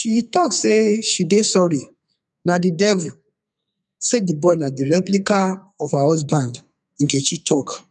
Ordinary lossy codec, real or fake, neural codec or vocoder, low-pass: none; fake; codec, 44.1 kHz, 2.6 kbps, SNAC; 10.8 kHz